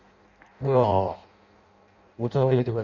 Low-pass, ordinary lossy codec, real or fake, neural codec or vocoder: 7.2 kHz; none; fake; codec, 16 kHz in and 24 kHz out, 0.6 kbps, FireRedTTS-2 codec